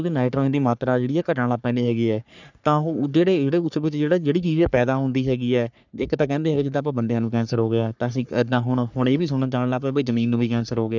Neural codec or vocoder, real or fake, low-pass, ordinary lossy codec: codec, 44.1 kHz, 3.4 kbps, Pupu-Codec; fake; 7.2 kHz; none